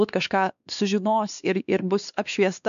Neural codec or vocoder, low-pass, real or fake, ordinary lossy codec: codec, 16 kHz, 2 kbps, X-Codec, WavLM features, trained on Multilingual LibriSpeech; 7.2 kHz; fake; MP3, 96 kbps